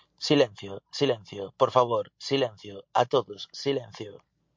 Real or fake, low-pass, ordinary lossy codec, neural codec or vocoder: real; 7.2 kHz; MP3, 48 kbps; none